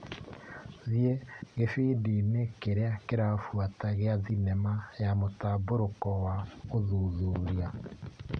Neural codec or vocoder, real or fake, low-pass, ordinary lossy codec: none; real; 9.9 kHz; none